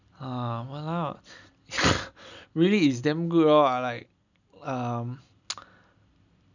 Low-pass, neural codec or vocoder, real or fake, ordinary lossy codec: 7.2 kHz; none; real; none